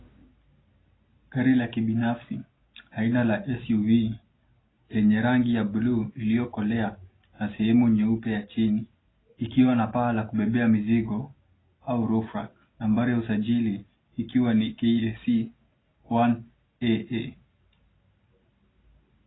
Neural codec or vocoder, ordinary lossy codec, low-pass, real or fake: none; AAC, 16 kbps; 7.2 kHz; real